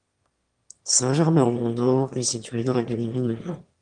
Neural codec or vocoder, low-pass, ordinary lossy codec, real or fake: autoencoder, 22.05 kHz, a latent of 192 numbers a frame, VITS, trained on one speaker; 9.9 kHz; Opus, 24 kbps; fake